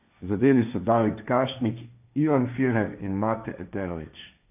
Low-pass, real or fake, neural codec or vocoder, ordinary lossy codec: 3.6 kHz; fake; codec, 16 kHz, 1.1 kbps, Voila-Tokenizer; none